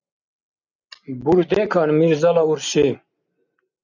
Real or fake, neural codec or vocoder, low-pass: real; none; 7.2 kHz